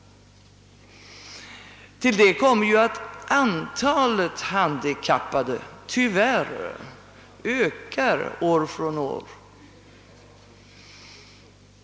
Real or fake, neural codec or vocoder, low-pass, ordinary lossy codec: real; none; none; none